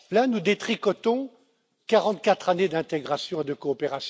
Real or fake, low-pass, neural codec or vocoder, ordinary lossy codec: real; none; none; none